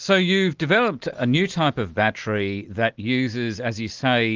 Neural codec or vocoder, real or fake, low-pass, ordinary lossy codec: none; real; 7.2 kHz; Opus, 32 kbps